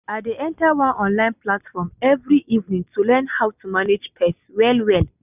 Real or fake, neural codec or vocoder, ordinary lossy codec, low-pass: real; none; none; 3.6 kHz